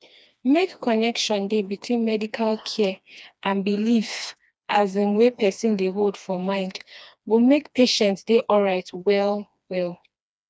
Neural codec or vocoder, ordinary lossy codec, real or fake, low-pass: codec, 16 kHz, 2 kbps, FreqCodec, smaller model; none; fake; none